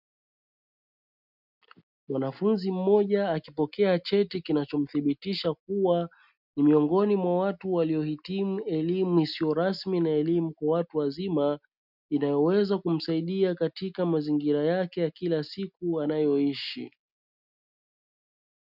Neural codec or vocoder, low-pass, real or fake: none; 5.4 kHz; real